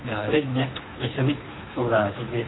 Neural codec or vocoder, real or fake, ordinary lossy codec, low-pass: codec, 24 kHz, 3 kbps, HILCodec; fake; AAC, 16 kbps; 7.2 kHz